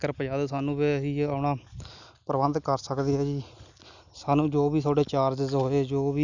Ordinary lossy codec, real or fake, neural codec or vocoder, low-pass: none; real; none; 7.2 kHz